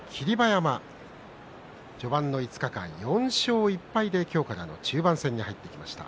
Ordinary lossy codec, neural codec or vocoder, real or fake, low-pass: none; none; real; none